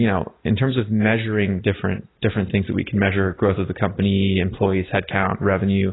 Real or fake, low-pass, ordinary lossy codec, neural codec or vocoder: real; 7.2 kHz; AAC, 16 kbps; none